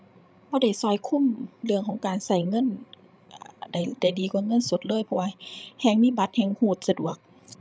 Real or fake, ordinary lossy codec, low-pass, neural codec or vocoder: fake; none; none; codec, 16 kHz, 16 kbps, FreqCodec, larger model